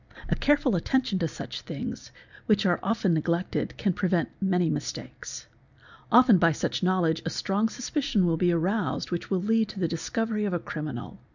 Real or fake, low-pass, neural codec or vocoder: real; 7.2 kHz; none